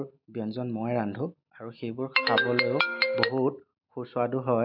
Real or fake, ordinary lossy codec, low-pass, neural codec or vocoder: real; none; 5.4 kHz; none